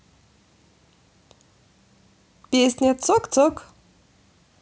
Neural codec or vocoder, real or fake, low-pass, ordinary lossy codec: none; real; none; none